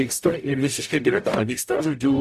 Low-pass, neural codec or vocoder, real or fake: 14.4 kHz; codec, 44.1 kHz, 0.9 kbps, DAC; fake